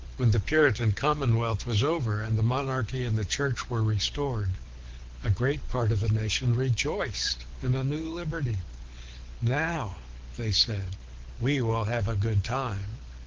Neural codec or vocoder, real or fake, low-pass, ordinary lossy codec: codec, 24 kHz, 6 kbps, HILCodec; fake; 7.2 kHz; Opus, 16 kbps